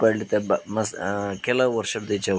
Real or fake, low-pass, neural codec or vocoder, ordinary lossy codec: real; none; none; none